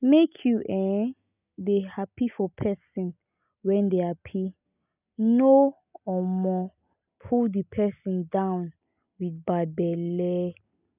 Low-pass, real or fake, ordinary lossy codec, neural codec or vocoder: 3.6 kHz; real; none; none